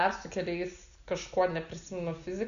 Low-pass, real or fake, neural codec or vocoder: 7.2 kHz; real; none